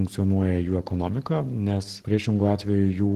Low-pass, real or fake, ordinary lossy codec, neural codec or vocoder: 14.4 kHz; fake; Opus, 16 kbps; codec, 44.1 kHz, 7.8 kbps, Pupu-Codec